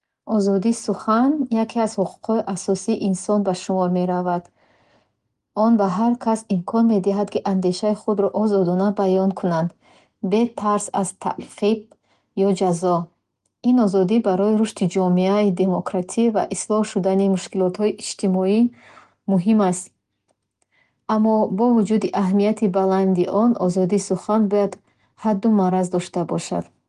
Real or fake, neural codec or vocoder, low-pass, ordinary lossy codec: real; none; 10.8 kHz; Opus, 24 kbps